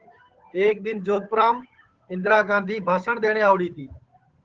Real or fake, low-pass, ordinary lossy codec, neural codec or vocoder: fake; 7.2 kHz; Opus, 16 kbps; codec, 16 kHz, 8 kbps, FunCodec, trained on Chinese and English, 25 frames a second